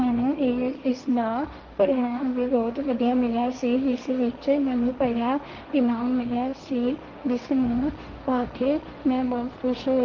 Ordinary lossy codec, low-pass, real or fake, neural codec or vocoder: Opus, 32 kbps; 7.2 kHz; fake; codec, 16 kHz, 1.1 kbps, Voila-Tokenizer